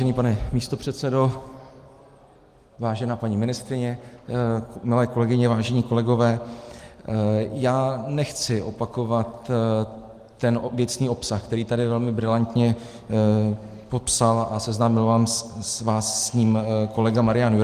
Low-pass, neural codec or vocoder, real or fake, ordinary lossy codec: 14.4 kHz; none; real; Opus, 24 kbps